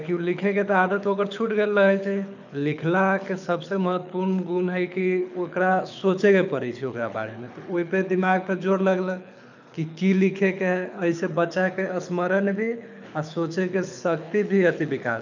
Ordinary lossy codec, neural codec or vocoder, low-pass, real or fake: none; codec, 24 kHz, 6 kbps, HILCodec; 7.2 kHz; fake